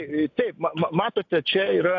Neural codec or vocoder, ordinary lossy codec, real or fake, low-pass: none; AAC, 48 kbps; real; 7.2 kHz